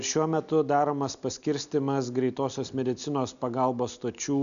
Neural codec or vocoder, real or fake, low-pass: none; real; 7.2 kHz